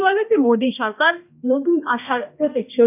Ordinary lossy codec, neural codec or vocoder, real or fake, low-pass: none; codec, 16 kHz, 1 kbps, X-Codec, HuBERT features, trained on balanced general audio; fake; 3.6 kHz